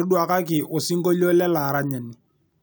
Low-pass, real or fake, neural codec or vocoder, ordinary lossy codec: none; real; none; none